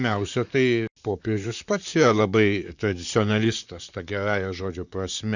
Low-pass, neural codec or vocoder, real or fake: 7.2 kHz; vocoder, 44.1 kHz, 128 mel bands, Pupu-Vocoder; fake